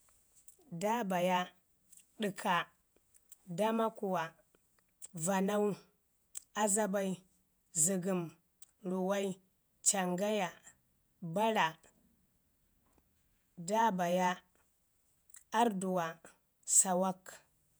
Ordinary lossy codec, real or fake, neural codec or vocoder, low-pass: none; fake; vocoder, 48 kHz, 128 mel bands, Vocos; none